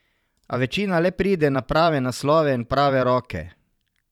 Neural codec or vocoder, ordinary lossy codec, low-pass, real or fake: vocoder, 44.1 kHz, 128 mel bands every 512 samples, BigVGAN v2; none; 19.8 kHz; fake